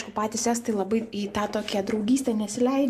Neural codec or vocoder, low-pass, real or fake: none; 14.4 kHz; real